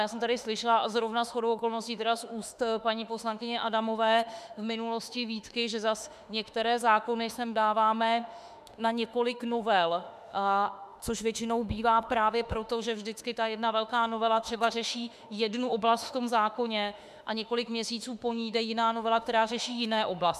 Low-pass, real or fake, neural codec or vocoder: 14.4 kHz; fake; autoencoder, 48 kHz, 32 numbers a frame, DAC-VAE, trained on Japanese speech